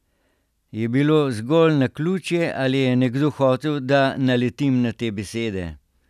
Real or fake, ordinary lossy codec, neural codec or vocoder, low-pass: real; none; none; 14.4 kHz